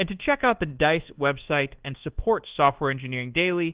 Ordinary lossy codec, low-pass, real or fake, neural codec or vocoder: Opus, 16 kbps; 3.6 kHz; fake; codec, 24 kHz, 1.2 kbps, DualCodec